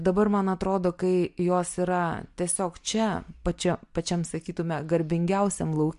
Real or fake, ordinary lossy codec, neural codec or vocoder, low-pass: real; MP3, 64 kbps; none; 10.8 kHz